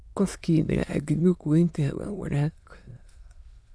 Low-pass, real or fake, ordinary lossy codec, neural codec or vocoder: none; fake; none; autoencoder, 22.05 kHz, a latent of 192 numbers a frame, VITS, trained on many speakers